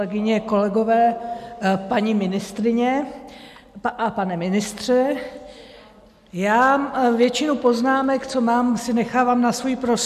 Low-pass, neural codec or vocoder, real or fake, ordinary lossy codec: 14.4 kHz; none; real; MP3, 96 kbps